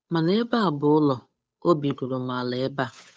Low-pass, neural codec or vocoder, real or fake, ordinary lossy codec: none; codec, 16 kHz, 8 kbps, FunCodec, trained on Chinese and English, 25 frames a second; fake; none